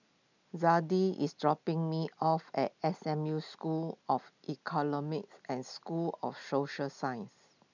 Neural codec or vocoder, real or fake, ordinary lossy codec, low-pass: none; real; none; 7.2 kHz